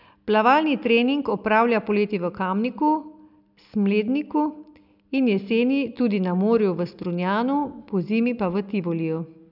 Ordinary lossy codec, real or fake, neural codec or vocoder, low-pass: none; real; none; 5.4 kHz